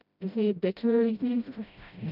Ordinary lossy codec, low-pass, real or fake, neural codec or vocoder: none; 5.4 kHz; fake; codec, 16 kHz, 0.5 kbps, FreqCodec, smaller model